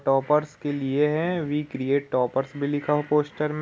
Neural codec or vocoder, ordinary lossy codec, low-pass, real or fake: none; none; none; real